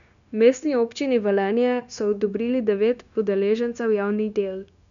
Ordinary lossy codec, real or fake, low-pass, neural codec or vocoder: none; fake; 7.2 kHz; codec, 16 kHz, 0.9 kbps, LongCat-Audio-Codec